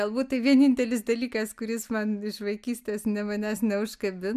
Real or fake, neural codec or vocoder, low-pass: real; none; 14.4 kHz